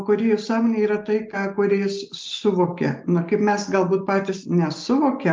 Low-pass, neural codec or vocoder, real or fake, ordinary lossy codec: 7.2 kHz; none; real; Opus, 24 kbps